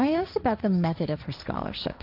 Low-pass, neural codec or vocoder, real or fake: 5.4 kHz; codec, 16 kHz, 1.1 kbps, Voila-Tokenizer; fake